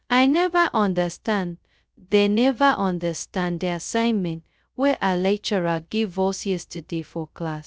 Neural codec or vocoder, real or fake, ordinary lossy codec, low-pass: codec, 16 kHz, 0.2 kbps, FocalCodec; fake; none; none